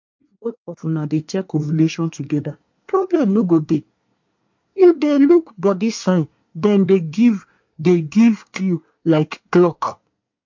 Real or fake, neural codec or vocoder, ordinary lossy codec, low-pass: fake; codec, 24 kHz, 1 kbps, SNAC; MP3, 48 kbps; 7.2 kHz